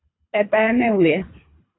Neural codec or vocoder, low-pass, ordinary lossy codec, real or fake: codec, 24 kHz, 3 kbps, HILCodec; 7.2 kHz; AAC, 16 kbps; fake